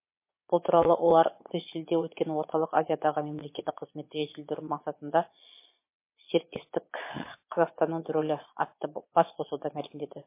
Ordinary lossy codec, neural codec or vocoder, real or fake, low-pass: MP3, 24 kbps; vocoder, 22.05 kHz, 80 mel bands, Vocos; fake; 3.6 kHz